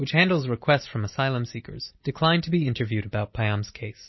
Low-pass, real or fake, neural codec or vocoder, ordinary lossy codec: 7.2 kHz; real; none; MP3, 24 kbps